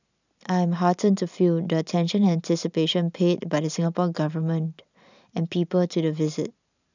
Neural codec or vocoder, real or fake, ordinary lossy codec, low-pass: none; real; none; 7.2 kHz